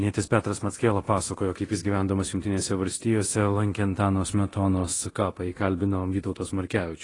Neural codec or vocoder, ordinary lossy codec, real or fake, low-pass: codec, 24 kHz, 0.9 kbps, DualCodec; AAC, 32 kbps; fake; 10.8 kHz